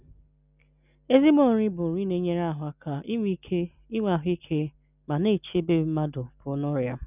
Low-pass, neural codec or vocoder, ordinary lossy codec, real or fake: 3.6 kHz; none; none; real